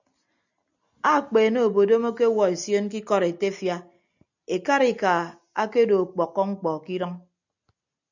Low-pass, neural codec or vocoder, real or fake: 7.2 kHz; none; real